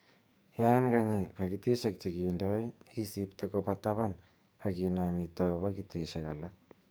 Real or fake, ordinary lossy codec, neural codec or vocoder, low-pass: fake; none; codec, 44.1 kHz, 2.6 kbps, SNAC; none